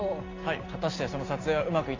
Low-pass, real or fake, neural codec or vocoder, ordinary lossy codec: 7.2 kHz; real; none; AAC, 32 kbps